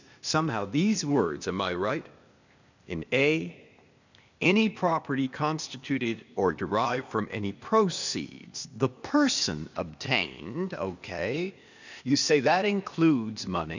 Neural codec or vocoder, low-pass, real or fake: codec, 16 kHz, 0.8 kbps, ZipCodec; 7.2 kHz; fake